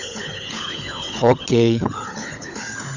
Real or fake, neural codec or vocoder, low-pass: fake; codec, 16 kHz, 16 kbps, FunCodec, trained on LibriTTS, 50 frames a second; 7.2 kHz